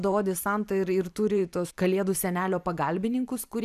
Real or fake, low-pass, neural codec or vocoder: fake; 14.4 kHz; vocoder, 44.1 kHz, 128 mel bands every 512 samples, BigVGAN v2